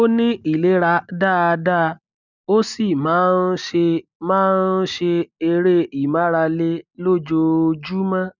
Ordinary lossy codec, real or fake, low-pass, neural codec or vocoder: none; real; 7.2 kHz; none